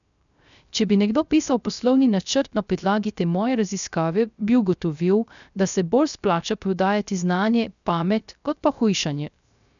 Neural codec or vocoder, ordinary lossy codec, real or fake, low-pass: codec, 16 kHz, 0.3 kbps, FocalCodec; none; fake; 7.2 kHz